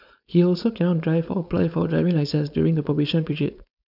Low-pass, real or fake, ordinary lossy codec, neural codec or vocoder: 5.4 kHz; fake; none; codec, 16 kHz, 4.8 kbps, FACodec